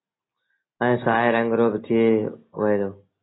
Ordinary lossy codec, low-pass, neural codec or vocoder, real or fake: AAC, 16 kbps; 7.2 kHz; none; real